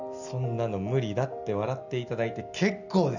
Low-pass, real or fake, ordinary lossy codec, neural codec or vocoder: 7.2 kHz; real; none; none